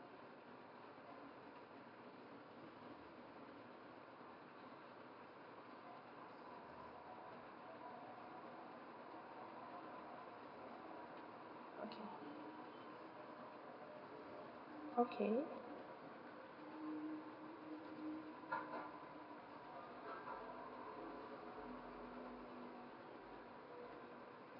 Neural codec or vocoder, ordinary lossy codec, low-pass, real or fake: none; none; 5.4 kHz; real